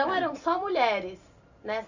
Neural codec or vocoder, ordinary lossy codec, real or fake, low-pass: none; none; real; 7.2 kHz